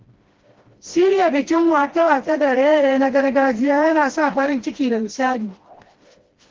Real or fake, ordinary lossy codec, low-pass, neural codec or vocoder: fake; Opus, 16 kbps; 7.2 kHz; codec, 16 kHz, 1 kbps, FreqCodec, smaller model